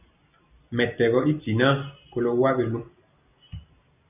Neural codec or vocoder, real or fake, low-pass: none; real; 3.6 kHz